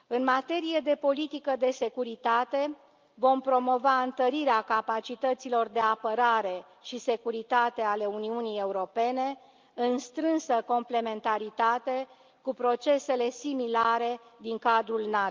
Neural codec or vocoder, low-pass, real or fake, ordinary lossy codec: none; 7.2 kHz; real; Opus, 24 kbps